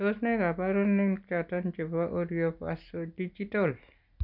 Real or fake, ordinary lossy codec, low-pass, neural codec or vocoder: real; none; 5.4 kHz; none